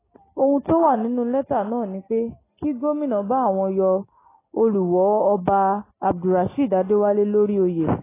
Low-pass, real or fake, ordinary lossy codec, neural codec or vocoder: 3.6 kHz; real; AAC, 16 kbps; none